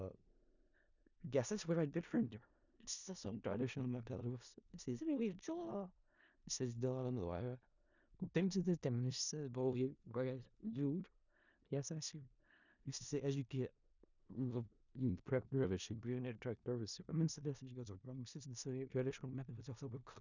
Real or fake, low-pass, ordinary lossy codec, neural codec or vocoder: fake; 7.2 kHz; none; codec, 16 kHz in and 24 kHz out, 0.4 kbps, LongCat-Audio-Codec, four codebook decoder